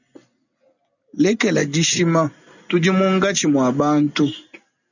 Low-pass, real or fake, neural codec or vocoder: 7.2 kHz; real; none